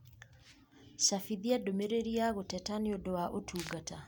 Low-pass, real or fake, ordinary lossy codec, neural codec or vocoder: none; real; none; none